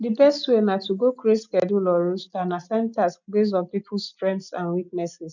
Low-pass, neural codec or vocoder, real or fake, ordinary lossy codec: 7.2 kHz; none; real; none